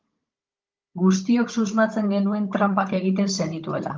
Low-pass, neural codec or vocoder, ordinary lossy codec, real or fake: 7.2 kHz; codec, 16 kHz, 16 kbps, FunCodec, trained on Chinese and English, 50 frames a second; Opus, 32 kbps; fake